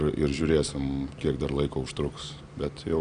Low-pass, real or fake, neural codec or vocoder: 9.9 kHz; real; none